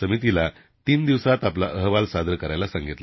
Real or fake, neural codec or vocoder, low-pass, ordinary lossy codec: real; none; 7.2 kHz; MP3, 24 kbps